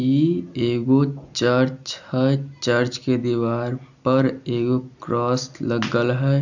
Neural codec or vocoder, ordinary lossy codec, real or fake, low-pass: none; none; real; 7.2 kHz